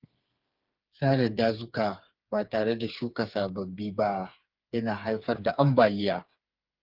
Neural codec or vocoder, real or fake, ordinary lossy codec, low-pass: codec, 16 kHz, 4 kbps, FreqCodec, smaller model; fake; Opus, 32 kbps; 5.4 kHz